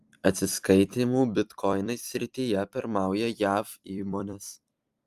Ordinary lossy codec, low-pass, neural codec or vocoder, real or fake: Opus, 32 kbps; 14.4 kHz; vocoder, 44.1 kHz, 128 mel bands every 256 samples, BigVGAN v2; fake